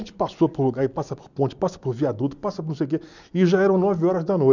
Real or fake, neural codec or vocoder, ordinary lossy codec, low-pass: real; none; none; 7.2 kHz